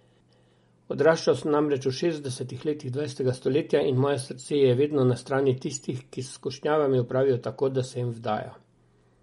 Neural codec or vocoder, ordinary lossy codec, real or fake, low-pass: none; MP3, 48 kbps; real; 19.8 kHz